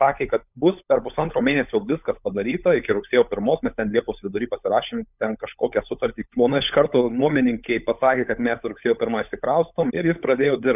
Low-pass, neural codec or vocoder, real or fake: 3.6 kHz; codec, 16 kHz, 16 kbps, FunCodec, trained on LibriTTS, 50 frames a second; fake